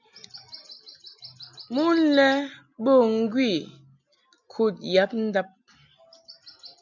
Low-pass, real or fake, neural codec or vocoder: 7.2 kHz; real; none